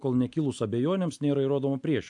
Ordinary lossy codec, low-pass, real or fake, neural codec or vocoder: AAC, 64 kbps; 10.8 kHz; real; none